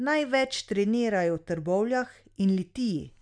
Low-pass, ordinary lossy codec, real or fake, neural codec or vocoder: 9.9 kHz; none; real; none